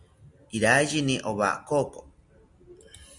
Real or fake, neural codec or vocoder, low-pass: real; none; 10.8 kHz